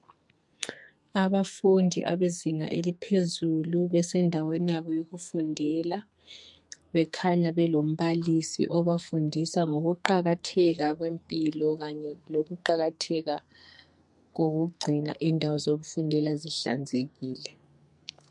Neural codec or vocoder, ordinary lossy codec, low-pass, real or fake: codec, 44.1 kHz, 2.6 kbps, SNAC; MP3, 64 kbps; 10.8 kHz; fake